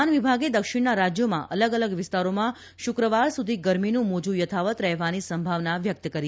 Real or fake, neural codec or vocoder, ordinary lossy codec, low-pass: real; none; none; none